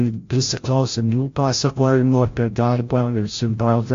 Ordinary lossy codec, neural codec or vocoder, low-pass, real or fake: AAC, 48 kbps; codec, 16 kHz, 0.5 kbps, FreqCodec, larger model; 7.2 kHz; fake